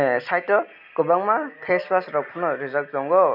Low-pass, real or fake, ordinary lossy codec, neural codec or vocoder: 5.4 kHz; real; none; none